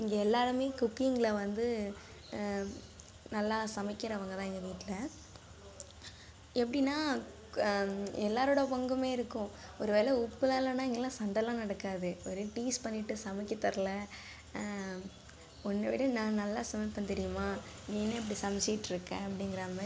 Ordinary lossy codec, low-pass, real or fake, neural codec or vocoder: none; none; real; none